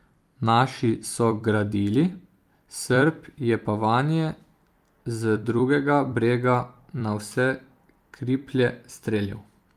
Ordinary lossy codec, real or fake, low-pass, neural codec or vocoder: Opus, 32 kbps; fake; 14.4 kHz; vocoder, 44.1 kHz, 128 mel bands every 256 samples, BigVGAN v2